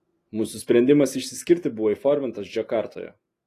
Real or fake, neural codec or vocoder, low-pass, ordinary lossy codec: real; none; 14.4 kHz; AAC, 48 kbps